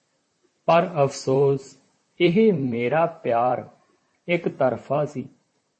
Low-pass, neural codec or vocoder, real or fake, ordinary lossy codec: 10.8 kHz; vocoder, 44.1 kHz, 128 mel bands, Pupu-Vocoder; fake; MP3, 32 kbps